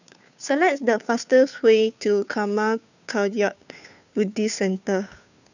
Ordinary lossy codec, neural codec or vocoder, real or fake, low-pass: none; codec, 16 kHz, 2 kbps, FunCodec, trained on Chinese and English, 25 frames a second; fake; 7.2 kHz